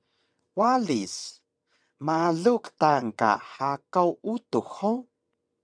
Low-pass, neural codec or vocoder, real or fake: 9.9 kHz; vocoder, 22.05 kHz, 80 mel bands, WaveNeXt; fake